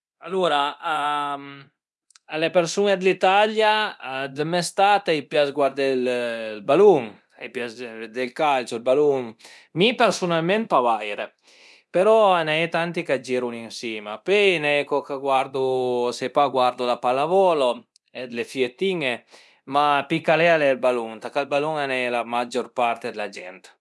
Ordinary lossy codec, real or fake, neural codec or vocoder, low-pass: none; fake; codec, 24 kHz, 0.9 kbps, DualCodec; none